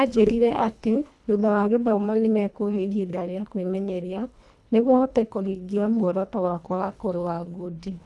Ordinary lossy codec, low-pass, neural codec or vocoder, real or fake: none; none; codec, 24 kHz, 1.5 kbps, HILCodec; fake